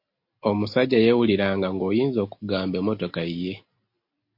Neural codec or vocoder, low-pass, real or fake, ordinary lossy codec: none; 5.4 kHz; real; MP3, 32 kbps